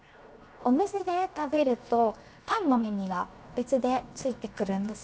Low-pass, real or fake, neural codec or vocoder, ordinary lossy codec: none; fake; codec, 16 kHz, 0.7 kbps, FocalCodec; none